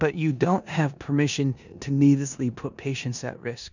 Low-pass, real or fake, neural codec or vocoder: 7.2 kHz; fake; codec, 16 kHz in and 24 kHz out, 0.9 kbps, LongCat-Audio-Codec, four codebook decoder